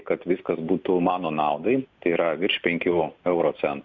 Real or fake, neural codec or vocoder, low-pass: real; none; 7.2 kHz